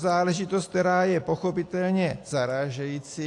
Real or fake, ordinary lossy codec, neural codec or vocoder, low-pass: real; AAC, 48 kbps; none; 10.8 kHz